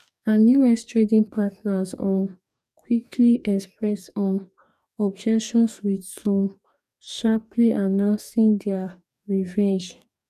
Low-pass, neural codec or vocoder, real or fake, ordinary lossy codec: 14.4 kHz; codec, 44.1 kHz, 2.6 kbps, DAC; fake; none